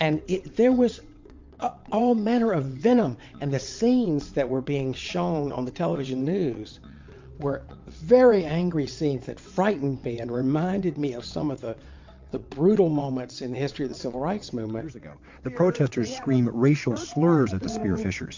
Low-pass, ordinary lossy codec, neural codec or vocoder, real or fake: 7.2 kHz; MP3, 48 kbps; vocoder, 22.05 kHz, 80 mel bands, WaveNeXt; fake